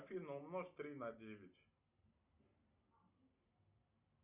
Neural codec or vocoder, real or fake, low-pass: none; real; 3.6 kHz